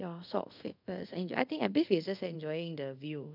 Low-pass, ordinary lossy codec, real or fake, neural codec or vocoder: 5.4 kHz; none; fake; codec, 24 kHz, 0.5 kbps, DualCodec